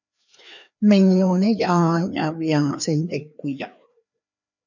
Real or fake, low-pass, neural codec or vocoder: fake; 7.2 kHz; codec, 16 kHz, 2 kbps, FreqCodec, larger model